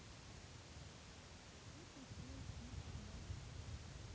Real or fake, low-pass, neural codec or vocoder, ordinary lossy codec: real; none; none; none